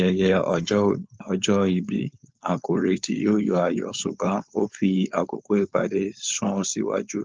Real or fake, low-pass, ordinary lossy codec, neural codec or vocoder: fake; 7.2 kHz; Opus, 24 kbps; codec, 16 kHz, 4.8 kbps, FACodec